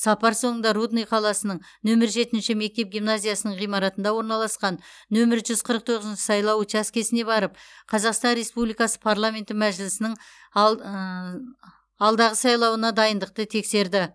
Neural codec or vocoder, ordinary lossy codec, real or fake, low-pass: none; none; real; none